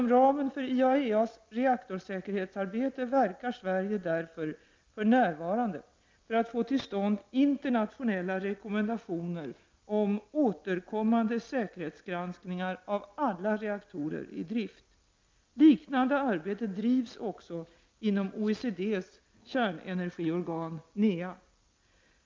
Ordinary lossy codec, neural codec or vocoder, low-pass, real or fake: Opus, 24 kbps; none; 7.2 kHz; real